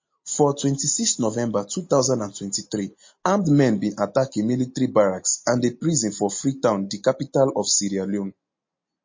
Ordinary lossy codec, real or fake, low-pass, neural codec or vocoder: MP3, 32 kbps; real; 7.2 kHz; none